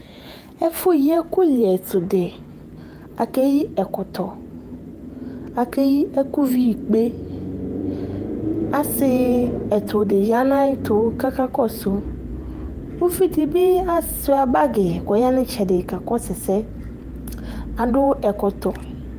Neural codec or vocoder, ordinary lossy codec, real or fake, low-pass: vocoder, 48 kHz, 128 mel bands, Vocos; Opus, 32 kbps; fake; 14.4 kHz